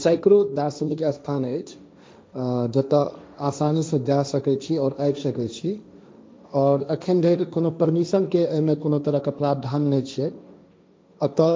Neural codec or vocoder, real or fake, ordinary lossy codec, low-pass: codec, 16 kHz, 1.1 kbps, Voila-Tokenizer; fake; none; none